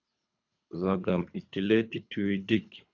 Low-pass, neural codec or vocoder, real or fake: 7.2 kHz; codec, 24 kHz, 3 kbps, HILCodec; fake